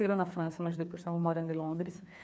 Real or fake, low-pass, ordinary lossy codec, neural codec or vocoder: fake; none; none; codec, 16 kHz, 2 kbps, FreqCodec, larger model